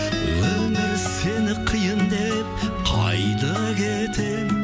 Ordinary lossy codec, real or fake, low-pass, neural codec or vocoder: none; real; none; none